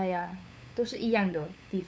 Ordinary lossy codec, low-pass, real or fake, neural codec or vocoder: none; none; fake; codec, 16 kHz, 8 kbps, FunCodec, trained on LibriTTS, 25 frames a second